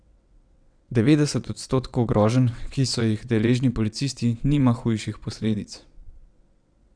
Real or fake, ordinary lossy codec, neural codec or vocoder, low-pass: fake; none; vocoder, 22.05 kHz, 80 mel bands, WaveNeXt; 9.9 kHz